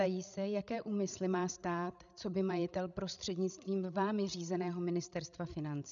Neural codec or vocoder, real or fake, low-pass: codec, 16 kHz, 16 kbps, FreqCodec, larger model; fake; 7.2 kHz